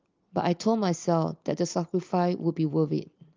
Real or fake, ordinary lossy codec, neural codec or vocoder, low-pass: real; Opus, 32 kbps; none; 7.2 kHz